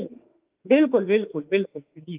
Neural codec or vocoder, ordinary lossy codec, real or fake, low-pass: autoencoder, 48 kHz, 32 numbers a frame, DAC-VAE, trained on Japanese speech; Opus, 24 kbps; fake; 3.6 kHz